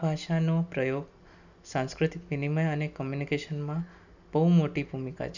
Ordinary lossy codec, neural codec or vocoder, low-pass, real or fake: none; none; 7.2 kHz; real